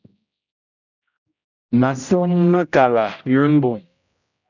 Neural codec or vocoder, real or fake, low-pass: codec, 16 kHz, 0.5 kbps, X-Codec, HuBERT features, trained on general audio; fake; 7.2 kHz